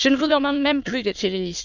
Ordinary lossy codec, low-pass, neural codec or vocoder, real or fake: none; 7.2 kHz; autoencoder, 22.05 kHz, a latent of 192 numbers a frame, VITS, trained on many speakers; fake